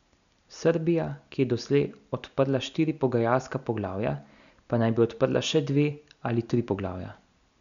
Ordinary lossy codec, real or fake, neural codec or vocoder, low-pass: none; real; none; 7.2 kHz